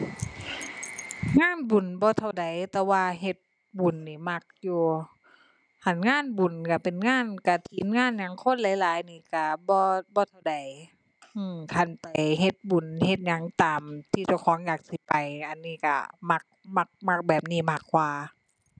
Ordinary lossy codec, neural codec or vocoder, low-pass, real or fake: none; none; 9.9 kHz; real